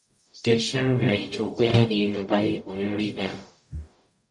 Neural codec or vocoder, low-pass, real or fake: codec, 44.1 kHz, 0.9 kbps, DAC; 10.8 kHz; fake